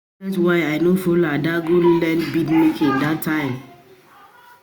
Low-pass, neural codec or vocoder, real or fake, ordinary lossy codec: none; none; real; none